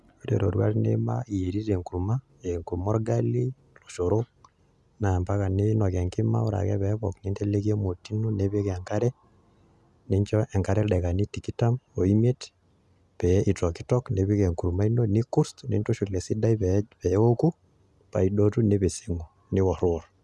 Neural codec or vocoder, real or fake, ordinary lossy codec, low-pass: none; real; none; none